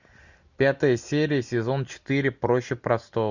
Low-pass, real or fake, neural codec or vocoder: 7.2 kHz; real; none